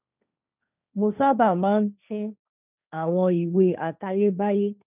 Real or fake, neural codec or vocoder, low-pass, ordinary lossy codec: fake; codec, 16 kHz, 1.1 kbps, Voila-Tokenizer; 3.6 kHz; none